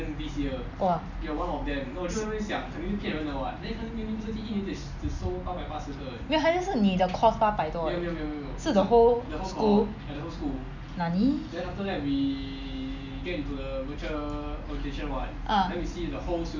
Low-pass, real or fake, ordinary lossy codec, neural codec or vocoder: 7.2 kHz; real; none; none